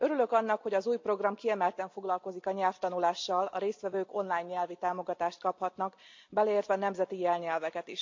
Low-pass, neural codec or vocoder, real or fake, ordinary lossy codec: 7.2 kHz; none; real; MP3, 48 kbps